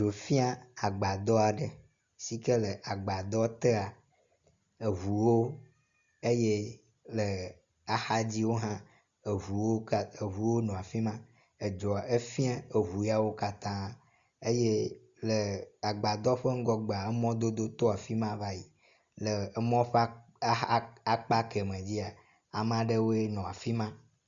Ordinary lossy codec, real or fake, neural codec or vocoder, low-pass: Opus, 64 kbps; real; none; 7.2 kHz